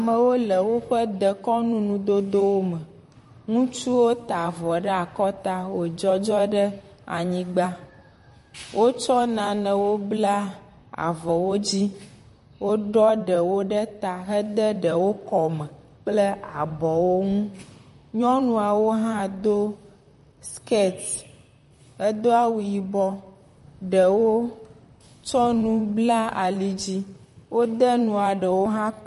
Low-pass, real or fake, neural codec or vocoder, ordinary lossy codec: 14.4 kHz; fake; vocoder, 44.1 kHz, 128 mel bands, Pupu-Vocoder; MP3, 48 kbps